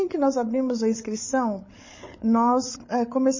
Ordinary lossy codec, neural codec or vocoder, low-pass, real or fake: MP3, 32 kbps; codec, 16 kHz, 8 kbps, FreqCodec, larger model; 7.2 kHz; fake